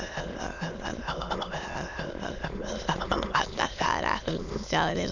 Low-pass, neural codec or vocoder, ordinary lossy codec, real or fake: 7.2 kHz; autoencoder, 22.05 kHz, a latent of 192 numbers a frame, VITS, trained on many speakers; none; fake